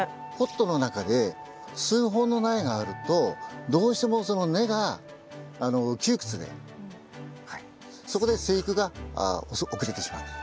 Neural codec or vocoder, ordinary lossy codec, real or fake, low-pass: none; none; real; none